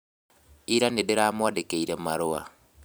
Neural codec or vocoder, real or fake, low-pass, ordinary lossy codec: vocoder, 44.1 kHz, 128 mel bands every 256 samples, BigVGAN v2; fake; none; none